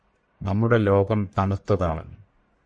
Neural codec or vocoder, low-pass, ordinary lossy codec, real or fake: codec, 44.1 kHz, 1.7 kbps, Pupu-Codec; 9.9 kHz; MP3, 48 kbps; fake